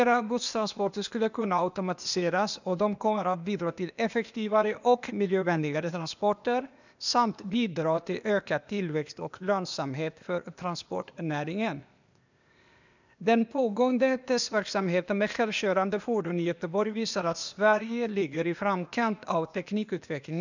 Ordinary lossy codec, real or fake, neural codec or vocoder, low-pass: none; fake; codec, 16 kHz, 0.8 kbps, ZipCodec; 7.2 kHz